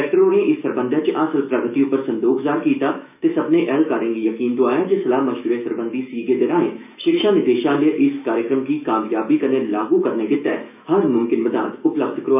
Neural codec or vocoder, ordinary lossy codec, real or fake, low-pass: autoencoder, 48 kHz, 128 numbers a frame, DAC-VAE, trained on Japanese speech; none; fake; 3.6 kHz